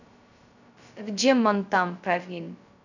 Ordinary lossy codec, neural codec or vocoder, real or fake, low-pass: none; codec, 16 kHz, 0.2 kbps, FocalCodec; fake; 7.2 kHz